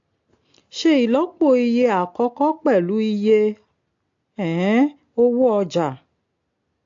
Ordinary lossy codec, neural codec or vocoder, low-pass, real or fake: AAC, 48 kbps; none; 7.2 kHz; real